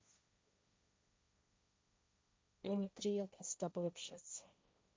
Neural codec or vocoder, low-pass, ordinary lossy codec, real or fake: codec, 16 kHz, 1.1 kbps, Voila-Tokenizer; none; none; fake